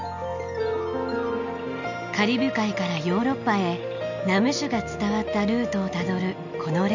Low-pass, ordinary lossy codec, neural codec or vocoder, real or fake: 7.2 kHz; none; none; real